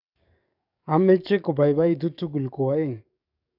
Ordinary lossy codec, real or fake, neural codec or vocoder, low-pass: none; fake; codec, 44.1 kHz, 7.8 kbps, DAC; 5.4 kHz